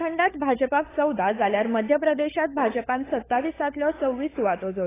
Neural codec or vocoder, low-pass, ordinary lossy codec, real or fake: codec, 16 kHz, 16 kbps, FunCodec, trained on LibriTTS, 50 frames a second; 3.6 kHz; AAC, 16 kbps; fake